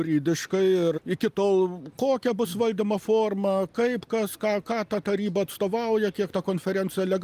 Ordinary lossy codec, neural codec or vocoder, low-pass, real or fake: Opus, 32 kbps; none; 14.4 kHz; real